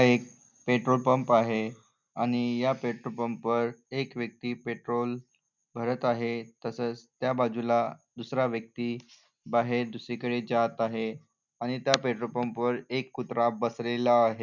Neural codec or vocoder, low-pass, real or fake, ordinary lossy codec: none; 7.2 kHz; real; none